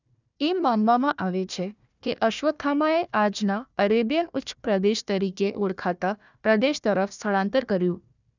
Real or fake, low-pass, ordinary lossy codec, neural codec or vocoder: fake; 7.2 kHz; none; codec, 16 kHz, 1 kbps, FunCodec, trained on Chinese and English, 50 frames a second